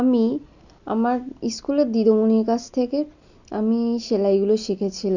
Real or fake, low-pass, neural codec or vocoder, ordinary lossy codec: real; 7.2 kHz; none; none